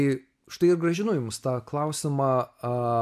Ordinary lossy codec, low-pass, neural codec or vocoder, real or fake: AAC, 96 kbps; 14.4 kHz; none; real